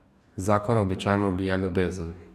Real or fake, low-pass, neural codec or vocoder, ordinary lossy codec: fake; 14.4 kHz; codec, 44.1 kHz, 2.6 kbps, DAC; none